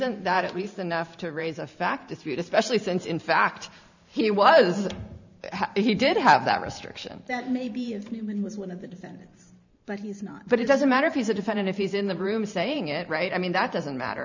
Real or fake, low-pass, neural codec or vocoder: fake; 7.2 kHz; vocoder, 44.1 kHz, 128 mel bands every 512 samples, BigVGAN v2